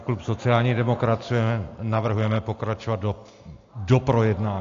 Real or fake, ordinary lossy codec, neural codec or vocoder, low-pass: real; AAC, 48 kbps; none; 7.2 kHz